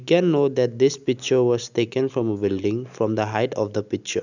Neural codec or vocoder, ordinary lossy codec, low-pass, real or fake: none; none; 7.2 kHz; real